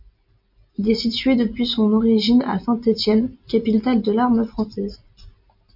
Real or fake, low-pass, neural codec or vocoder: real; 5.4 kHz; none